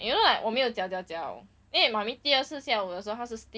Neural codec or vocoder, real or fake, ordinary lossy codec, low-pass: none; real; none; none